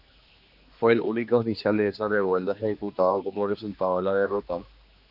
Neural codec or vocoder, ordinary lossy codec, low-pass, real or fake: codec, 16 kHz, 2 kbps, X-Codec, HuBERT features, trained on general audio; AAC, 48 kbps; 5.4 kHz; fake